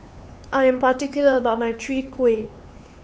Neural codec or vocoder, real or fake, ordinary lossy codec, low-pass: codec, 16 kHz, 4 kbps, X-Codec, HuBERT features, trained on LibriSpeech; fake; none; none